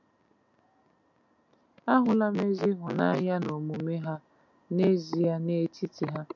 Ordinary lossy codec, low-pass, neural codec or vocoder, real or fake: MP3, 48 kbps; 7.2 kHz; none; real